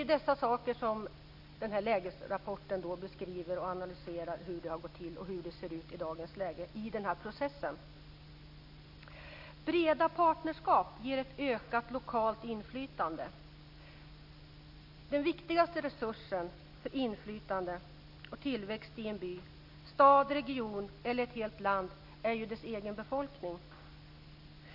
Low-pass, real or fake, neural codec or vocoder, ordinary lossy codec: 5.4 kHz; real; none; none